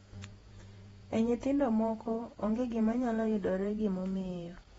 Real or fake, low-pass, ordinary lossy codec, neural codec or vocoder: fake; 19.8 kHz; AAC, 24 kbps; vocoder, 48 kHz, 128 mel bands, Vocos